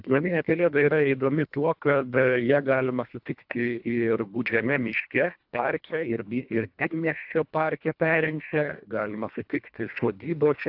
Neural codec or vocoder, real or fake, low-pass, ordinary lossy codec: codec, 24 kHz, 1.5 kbps, HILCodec; fake; 5.4 kHz; AAC, 48 kbps